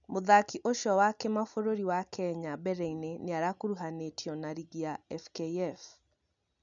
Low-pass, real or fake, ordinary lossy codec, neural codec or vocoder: 7.2 kHz; real; none; none